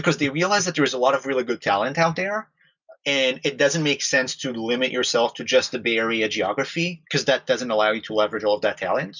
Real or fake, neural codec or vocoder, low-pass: real; none; 7.2 kHz